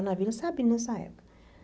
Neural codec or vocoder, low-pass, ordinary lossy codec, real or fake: none; none; none; real